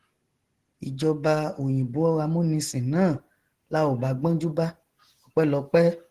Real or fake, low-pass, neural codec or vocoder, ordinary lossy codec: real; 14.4 kHz; none; Opus, 16 kbps